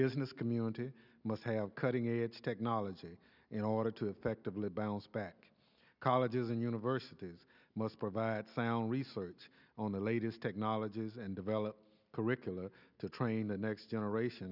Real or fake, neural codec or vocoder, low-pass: real; none; 5.4 kHz